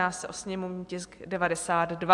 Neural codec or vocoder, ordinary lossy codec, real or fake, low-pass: none; AAC, 64 kbps; real; 10.8 kHz